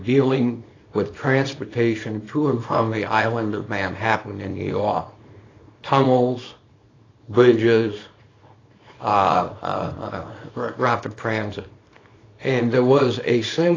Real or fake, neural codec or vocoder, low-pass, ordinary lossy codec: fake; codec, 24 kHz, 0.9 kbps, WavTokenizer, small release; 7.2 kHz; AAC, 32 kbps